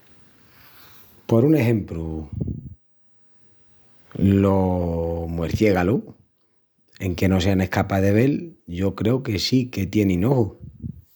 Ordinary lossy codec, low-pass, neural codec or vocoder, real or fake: none; none; none; real